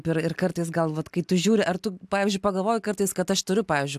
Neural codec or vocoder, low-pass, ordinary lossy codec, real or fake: none; 14.4 kHz; AAC, 96 kbps; real